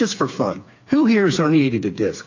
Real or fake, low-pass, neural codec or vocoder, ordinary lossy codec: fake; 7.2 kHz; codec, 16 kHz, 4 kbps, FreqCodec, smaller model; AAC, 48 kbps